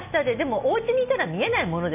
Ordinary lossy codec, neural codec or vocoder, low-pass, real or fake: none; none; 3.6 kHz; real